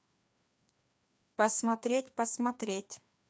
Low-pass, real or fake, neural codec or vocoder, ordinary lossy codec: none; fake; codec, 16 kHz, 2 kbps, FreqCodec, larger model; none